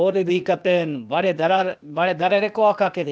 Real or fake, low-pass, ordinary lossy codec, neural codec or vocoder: fake; none; none; codec, 16 kHz, 0.8 kbps, ZipCodec